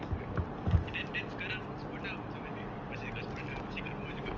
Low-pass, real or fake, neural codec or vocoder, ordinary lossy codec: 7.2 kHz; real; none; Opus, 24 kbps